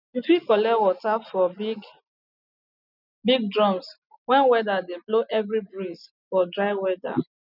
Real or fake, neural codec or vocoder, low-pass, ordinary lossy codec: real; none; 5.4 kHz; none